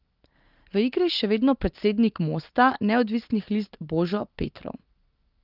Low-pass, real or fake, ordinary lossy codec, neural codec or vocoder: 5.4 kHz; real; Opus, 24 kbps; none